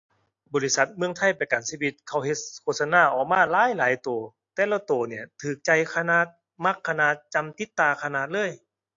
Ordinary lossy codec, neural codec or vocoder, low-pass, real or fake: AAC, 48 kbps; none; 7.2 kHz; real